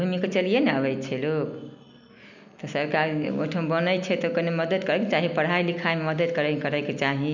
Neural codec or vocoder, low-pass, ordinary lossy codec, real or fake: none; 7.2 kHz; none; real